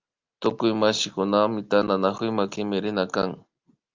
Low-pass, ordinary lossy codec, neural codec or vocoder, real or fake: 7.2 kHz; Opus, 24 kbps; none; real